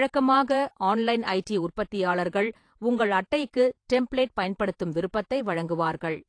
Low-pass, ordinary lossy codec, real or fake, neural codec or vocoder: 9.9 kHz; AAC, 48 kbps; fake; vocoder, 48 kHz, 128 mel bands, Vocos